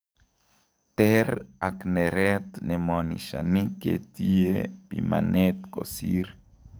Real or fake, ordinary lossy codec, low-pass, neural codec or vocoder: fake; none; none; codec, 44.1 kHz, 7.8 kbps, DAC